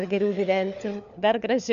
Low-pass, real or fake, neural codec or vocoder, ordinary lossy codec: 7.2 kHz; fake; codec, 16 kHz, 4 kbps, FunCodec, trained on LibriTTS, 50 frames a second; MP3, 64 kbps